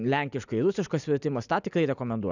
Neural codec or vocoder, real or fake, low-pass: none; real; 7.2 kHz